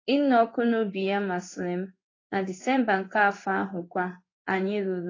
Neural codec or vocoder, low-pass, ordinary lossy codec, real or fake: codec, 16 kHz in and 24 kHz out, 1 kbps, XY-Tokenizer; 7.2 kHz; AAC, 32 kbps; fake